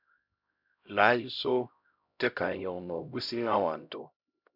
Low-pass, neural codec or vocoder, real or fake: 5.4 kHz; codec, 16 kHz, 0.5 kbps, X-Codec, HuBERT features, trained on LibriSpeech; fake